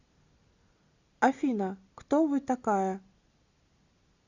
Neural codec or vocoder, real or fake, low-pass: none; real; 7.2 kHz